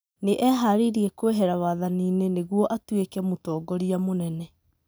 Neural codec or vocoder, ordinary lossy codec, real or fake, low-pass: none; none; real; none